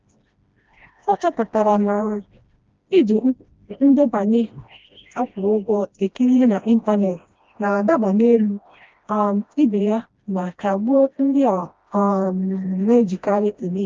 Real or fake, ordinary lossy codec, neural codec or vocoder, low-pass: fake; Opus, 24 kbps; codec, 16 kHz, 1 kbps, FreqCodec, smaller model; 7.2 kHz